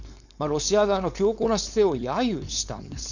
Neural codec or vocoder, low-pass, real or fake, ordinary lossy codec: codec, 16 kHz, 4.8 kbps, FACodec; 7.2 kHz; fake; none